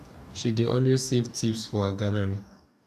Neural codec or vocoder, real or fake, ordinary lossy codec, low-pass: codec, 44.1 kHz, 2.6 kbps, DAC; fake; none; 14.4 kHz